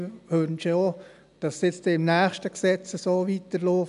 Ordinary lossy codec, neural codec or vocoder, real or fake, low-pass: none; none; real; 10.8 kHz